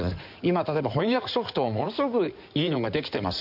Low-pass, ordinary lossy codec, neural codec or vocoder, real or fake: 5.4 kHz; none; codec, 16 kHz in and 24 kHz out, 2.2 kbps, FireRedTTS-2 codec; fake